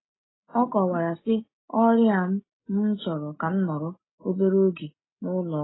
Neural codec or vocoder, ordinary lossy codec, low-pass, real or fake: none; AAC, 16 kbps; 7.2 kHz; real